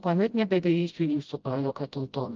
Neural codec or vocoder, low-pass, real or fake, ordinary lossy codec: codec, 16 kHz, 0.5 kbps, FreqCodec, smaller model; 7.2 kHz; fake; Opus, 24 kbps